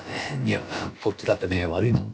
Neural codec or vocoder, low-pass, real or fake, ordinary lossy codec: codec, 16 kHz, about 1 kbps, DyCAST, with the encoder's durations; none; fake; none